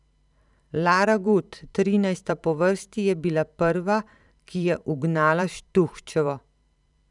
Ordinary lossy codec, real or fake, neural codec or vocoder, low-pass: none; fake; vocoder, 44.1 kHz, 128 mel bands every 512 samples, BigVGAN v2; 10.8 kHz